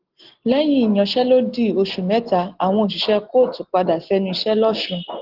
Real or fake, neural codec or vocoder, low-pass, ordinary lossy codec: real; none; 5.4 kHz; Opus, 16 kbps